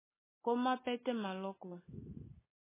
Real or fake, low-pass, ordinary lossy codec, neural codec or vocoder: real; 3.6 kHz; MP3, 16 kbps; none